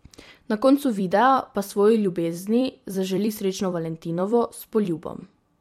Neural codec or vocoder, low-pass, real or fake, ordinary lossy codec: vocoder, 44.1 kHz, 128 mel bands every 256 samples, BigVGAN v2; 19.8 kHz; fake; MP3, 64 kbps